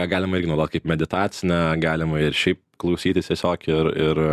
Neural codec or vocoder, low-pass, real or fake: none; 14.4 kHz; real